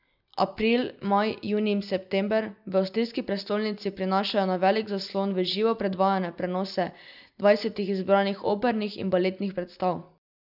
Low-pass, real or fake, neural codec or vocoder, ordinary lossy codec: 5.4 kHz; real; none; none